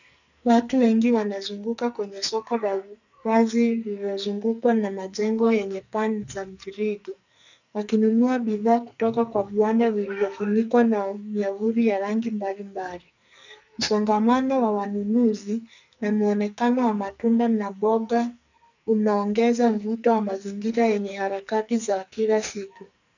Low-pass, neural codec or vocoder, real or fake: 7.2 kHz; codec, 44.1 kHz, 2.6 kbps, SNAC; fake